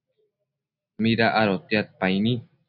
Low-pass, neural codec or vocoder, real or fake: 5.4 kHz; none; real